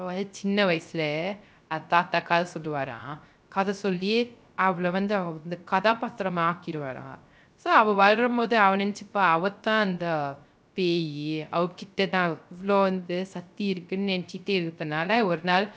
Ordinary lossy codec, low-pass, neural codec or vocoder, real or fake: none; none; codec, 16 kHz, 0.3 kbps, FocalCodec; fake